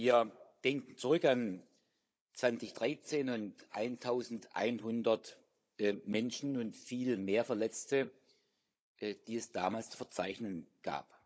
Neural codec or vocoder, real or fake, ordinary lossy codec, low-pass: codec, 16 kHz, 16 kbps, FunCodec, trained on Chinese and English, 50 frames a second; fake; none; none